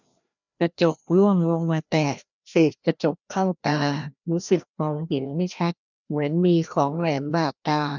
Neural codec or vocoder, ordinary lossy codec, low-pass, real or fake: codec, 16 kHz, 1 kbps, FreqCodec, larger model; none; 7.2 kHz; fake